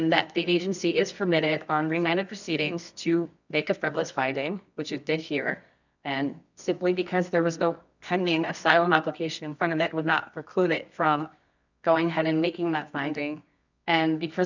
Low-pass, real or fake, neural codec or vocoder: 7.2 kHz; fake; codec, 24 kHz, 0.9 kbps, WavTokenizer, medium music audio release